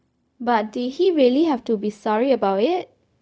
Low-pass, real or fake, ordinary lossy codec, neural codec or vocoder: none; fake; none; codec, 16 kHz, 0.4 kbps, LongCat-Audio-Codec